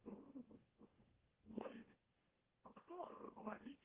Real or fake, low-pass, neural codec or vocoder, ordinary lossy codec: fake; 3.6 kHz; autoencoder, 44.1 kHz, a latent of 192 numbers a frame, MeloTTS; Opus, 16 kbps